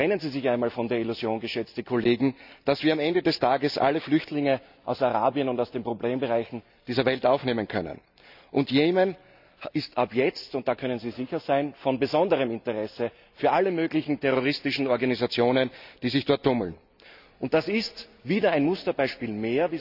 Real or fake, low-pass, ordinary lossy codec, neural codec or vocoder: real; 5.4 kHz; none; none